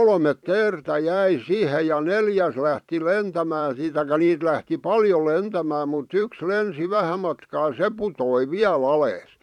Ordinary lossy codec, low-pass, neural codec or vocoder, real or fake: none; 19.8 kHz; vocoder, 44.1 kHz, 128 mel bands every 512 samples, BigVGAN v2; fake